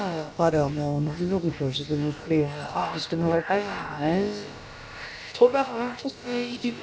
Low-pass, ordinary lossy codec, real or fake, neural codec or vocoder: none; none; fake; codec, 16 kHz, about 1 kbps, DyCAST, with the encoder's durations